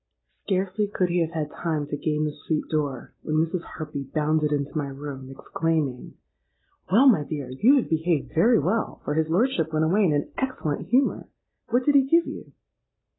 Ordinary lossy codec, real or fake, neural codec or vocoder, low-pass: AAC, 16 kbps; real; none; 7.2 kHz